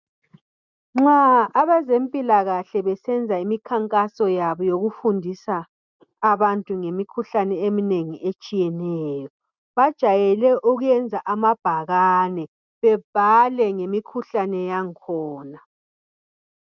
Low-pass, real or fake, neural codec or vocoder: 7.2 kHz; real; none